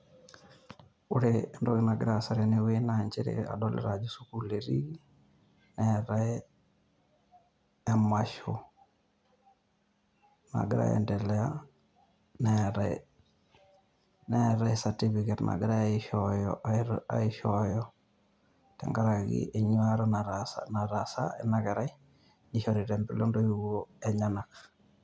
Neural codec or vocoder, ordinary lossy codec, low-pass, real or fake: none; none; none; real